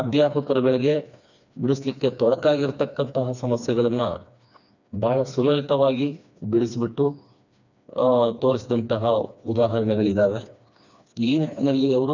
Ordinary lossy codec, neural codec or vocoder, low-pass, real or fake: none; codec, 16 kHz, 2 kbps, FreqCodec, smaller model; 7.2 kHz; fake